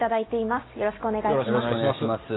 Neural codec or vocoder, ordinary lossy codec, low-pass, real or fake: none; AAC, 16 kbps; 7.2 kHz; real